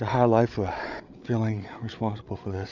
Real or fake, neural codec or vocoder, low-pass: fake; codec, 16 kHz, 8 kbps, FreqCodec, larger model; 7.2 kHz